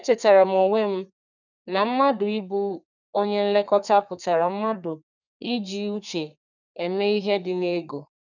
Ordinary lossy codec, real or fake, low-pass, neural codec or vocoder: none; fake; 7.2 kHz; codec, 32 kHz, 1.9 kbps, SNAC